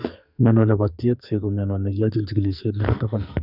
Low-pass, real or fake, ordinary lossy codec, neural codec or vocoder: 5.4 kHz; fake; none; codec, 44.1 kHz, 2.6 kbps, SNAC